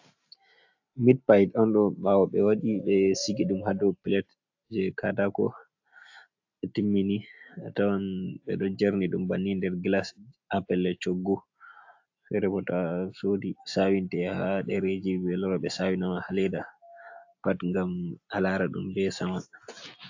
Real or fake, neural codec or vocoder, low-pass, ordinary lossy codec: real; none; 7.2 kHz; AAC, 48 kbps